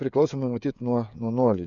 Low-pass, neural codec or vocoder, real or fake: 7.2 kHz; codec, 16 kHz, 16 kbps, FreqCodec, smaller model; fake